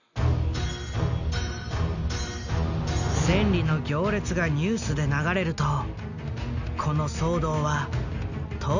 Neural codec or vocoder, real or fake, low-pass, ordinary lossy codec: none; real; 7.2 kHz; none